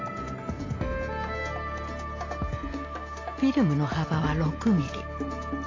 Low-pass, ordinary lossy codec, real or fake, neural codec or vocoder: 7.2 kHz; AAC, 32 kbps; real; none